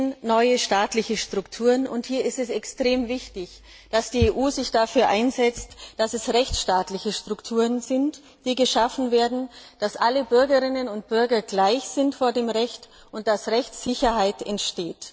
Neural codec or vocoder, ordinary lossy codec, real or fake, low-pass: none; none; real; none